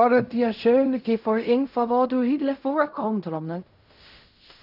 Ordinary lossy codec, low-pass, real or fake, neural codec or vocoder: none; 5.4 kHz; fake; codec, 16 kHz in and 24 kHz out, 0.4 kbps, LongCat-Audio-Codec, fine tuned four codebook decoder